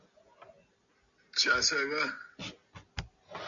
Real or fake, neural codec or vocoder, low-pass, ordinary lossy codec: real; none; 7.2 kHz; MP3, 96 kbps